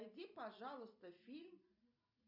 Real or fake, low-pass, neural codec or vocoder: real; 5.4 kHz; none